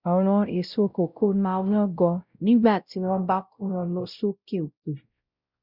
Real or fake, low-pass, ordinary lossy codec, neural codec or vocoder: fake; 5.4 kHz; Opus, 64 kbps; codec, 16 kHz, 0.5 kbps, X-Codec, WavLM features, trained on Multilingual LibriSpeech